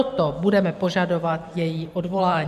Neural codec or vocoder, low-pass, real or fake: vocoder, 44.1 kHz, 128 mel bands every 512 samples, BigVGAN v2; 14.4 kHz; fake